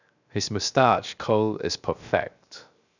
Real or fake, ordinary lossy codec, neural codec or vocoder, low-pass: fake; none; codec, 16 kHz, 0.7 kbps, FocalCodec; 7.2 kHz